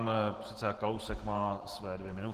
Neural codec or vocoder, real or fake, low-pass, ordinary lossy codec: vocoder, 44.1 kHz, 128 mel bands every 512 samples, BigVGAN v2; fake; 14.4 kHz; Opus, 16 kbps